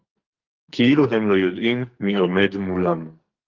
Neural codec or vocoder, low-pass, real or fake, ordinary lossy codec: codec, 44.1 kHz, 2.6 kbps, SNAC; 7.2 kHz; fake; Opus, 24 kbps